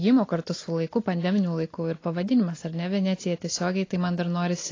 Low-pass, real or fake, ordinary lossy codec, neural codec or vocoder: 7.2 kHz; real; AAC, 32 kbps; none